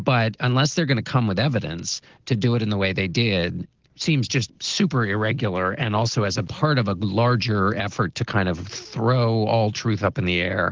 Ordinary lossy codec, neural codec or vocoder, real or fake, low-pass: Opus, 16 kbps; none; real; 7.2 kHz